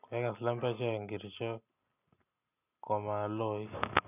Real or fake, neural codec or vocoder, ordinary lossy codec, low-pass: real; none; none; 3.6 kHz